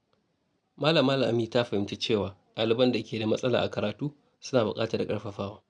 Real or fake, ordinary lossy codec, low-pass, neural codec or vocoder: real; none; 9.9 kHz; none